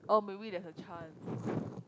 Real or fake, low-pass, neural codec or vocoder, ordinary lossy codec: real; none; none; none